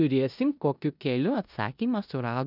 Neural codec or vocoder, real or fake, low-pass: codec, 16 kHz in and 24 kHz out, 0.9 kbps, LongCat-Audio-Codec, four codebook decoder; fake; 5.4 kHz